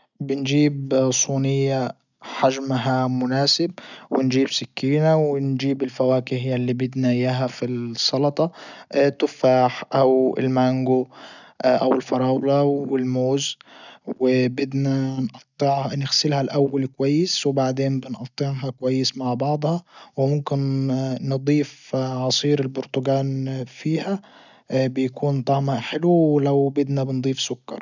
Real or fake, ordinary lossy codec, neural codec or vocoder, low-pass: real; none; none; 7.2 kHz